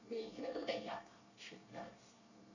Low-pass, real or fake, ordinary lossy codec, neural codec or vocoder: 7.2 kHz; fake; none; codec, 24 kHz, 1 kbps, SNAC